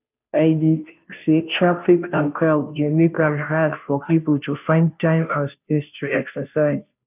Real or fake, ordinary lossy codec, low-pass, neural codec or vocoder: fake; none; 3.6 kHz; codec, 16 kHz, 0.5 kbps, FunCodec, trained on Chinese and English, 25 frames a second